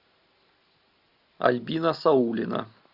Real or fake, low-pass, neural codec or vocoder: real; 5.4 kHz; none